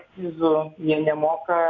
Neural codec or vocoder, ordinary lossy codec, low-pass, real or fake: none; AAC, 48 kbps; 7.2 kHz; real